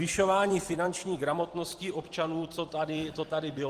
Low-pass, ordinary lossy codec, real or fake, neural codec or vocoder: 14.4 kHz; Opus, 24 kbps; fake; vocoder, 44.1 kHz, 128 mel bands every 256 samples, BigVGAN v2